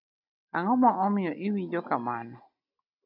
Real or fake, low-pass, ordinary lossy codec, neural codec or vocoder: real; 5.4 kHz; MP3, 48 kbps; none